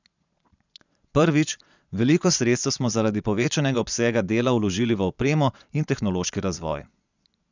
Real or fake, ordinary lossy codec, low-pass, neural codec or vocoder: fake; none; 7.2 kHz; vocoder, 22.05 kHz, 80 mel bands, Vocos